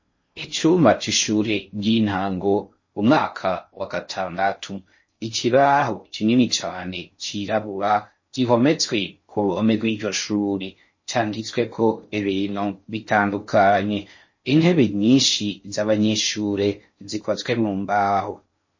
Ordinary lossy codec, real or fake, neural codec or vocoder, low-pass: MP3, 32 kbps; fake; codec, 16 kHz in and 24 kHz out, 0.6 kbps, FocalCodec, streaming, 4096 codes; 7.2 kHz